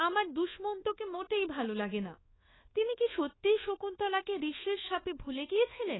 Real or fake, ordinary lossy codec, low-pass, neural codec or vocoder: fake; AAC, 16 kbps; 7.2 kHz; codec, 24 kHz, 1.2 kbps, DualCodec